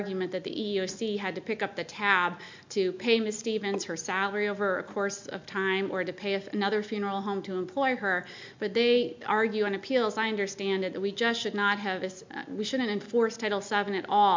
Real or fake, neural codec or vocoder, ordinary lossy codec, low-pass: real; none; MP3, 64 kbps; 7.2 kHz